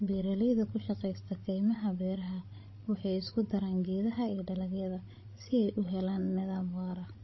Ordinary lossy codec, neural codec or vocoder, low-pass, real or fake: MP3, 24 kbps; codec, 16 kHz, 16 kbps, FreqCodec, larger model; 7.2 kHz; fake